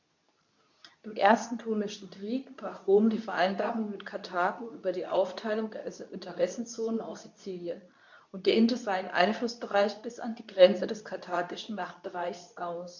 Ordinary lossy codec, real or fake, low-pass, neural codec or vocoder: none; fake; 7.2 kHz; codec, 24 kHz, 0.9 kbps, WavTokenizer, medium speech release version 2